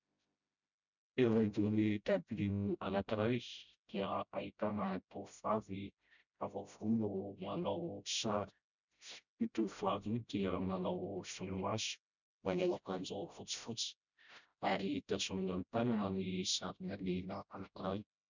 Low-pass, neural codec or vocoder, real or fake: 7.2 kHz; codec, 16 kHz, 0.5 kbps, FreqCodec, smaller model; fake